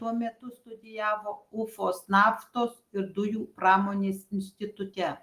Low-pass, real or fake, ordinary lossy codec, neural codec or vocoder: 14.4 kHz; real; Opus, 24 kbps; none